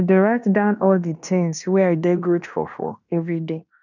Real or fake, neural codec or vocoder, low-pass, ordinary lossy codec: fake; codec, 16 kHz in and 24 kHz out, 0.9 kbps, LongCat-Audio-Codec, fine tuned four codebook decoder; 7.2 kHz; none